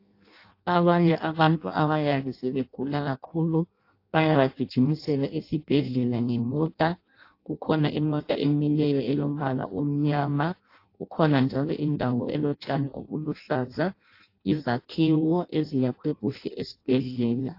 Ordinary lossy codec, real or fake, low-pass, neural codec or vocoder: AAC, 32 kbps; fake; 5.4 kHz; codec, 16 kHz in and 24 kHz out, 0.6 kbps, FireRedTTS-2 codec